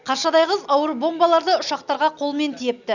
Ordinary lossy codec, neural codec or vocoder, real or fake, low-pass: none; none; real; 7.2 kHz